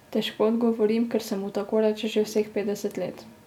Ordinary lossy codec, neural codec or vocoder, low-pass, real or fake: none; none; 19.8 kHz; real